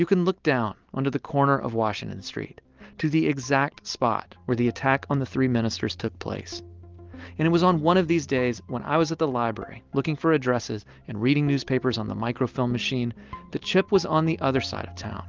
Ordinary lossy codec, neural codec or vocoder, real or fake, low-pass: Opus, 32 kbps; none; real; 7.2 kHz